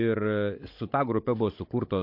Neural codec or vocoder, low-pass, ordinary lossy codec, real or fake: codec, 16 kHz, 16 kbps, FunCodec, trained on Chinese and English, 50 frames a second; 5.4 kHz; MP3, 32 kbps; fake